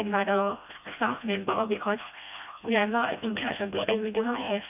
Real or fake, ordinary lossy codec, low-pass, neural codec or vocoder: fake; none; 3.6 kHz; codec, 16 kHz, 1 kbps, FreqCodec, smaller model